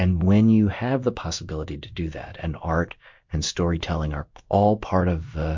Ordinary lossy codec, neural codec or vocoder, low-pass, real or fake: MP3, 48 kbps; codec, 16 kHz in and 24 kHz out, 1 kbps, XY-Tokenizer; 7.2 kHz; fake